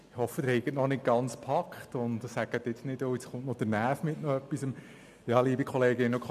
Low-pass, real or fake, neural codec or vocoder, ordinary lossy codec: 14.4 kHz; real; none; MP3, 96 kbps